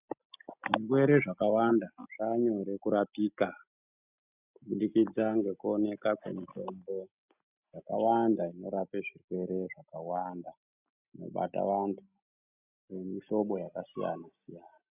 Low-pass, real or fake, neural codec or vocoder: 3.6 kHz; real; none